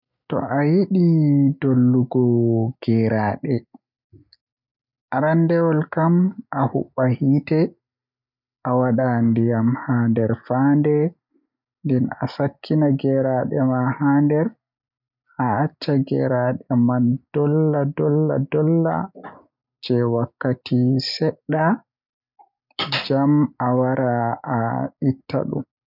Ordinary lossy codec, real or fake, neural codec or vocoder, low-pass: none; real; none; 5.4 kHz